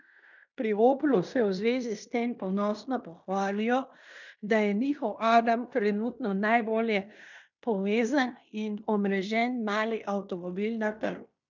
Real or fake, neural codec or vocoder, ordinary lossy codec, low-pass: fake; codec, 16 kHz in and 24 kHz out, 0.9 kbps, LongCat-Audio-Codec, fine tuned four codebook decoder; none; 7.2 kHz